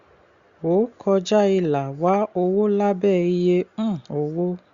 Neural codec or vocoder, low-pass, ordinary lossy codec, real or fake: none; 7.2 kHz; Opus, 64 kbps; real